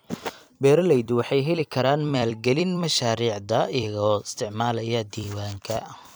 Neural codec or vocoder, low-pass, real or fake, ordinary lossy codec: vocoder, 44.1 kHz, 128 mel bands every 256 samples, BigVGAN v2; none; fake; none